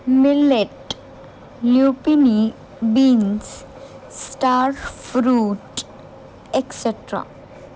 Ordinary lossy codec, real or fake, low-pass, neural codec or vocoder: none; real; none; none